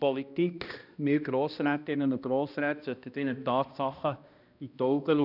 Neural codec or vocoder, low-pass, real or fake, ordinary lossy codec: codec, 16 kHz, 1 kbps, X-Codec, HuBERT features, trained on balanced general audio; 5.4 kHz; fake; none